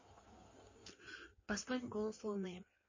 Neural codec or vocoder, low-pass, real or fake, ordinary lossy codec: codec, 16 kHz in and 24 kHz out, 1.1 kbps, FireRedTTS-2 codec; 7.2 kHz; fake; MP3, 32 kbps